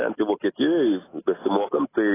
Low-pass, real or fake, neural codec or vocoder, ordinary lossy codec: 3.6 kHz; real; none; AAC, 16 kbps